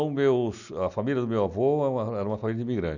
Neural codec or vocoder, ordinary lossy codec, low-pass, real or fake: none; none; 7.2 kHz; real